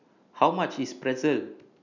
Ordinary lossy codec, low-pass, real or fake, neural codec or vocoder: none; 7.2 kHz; real; none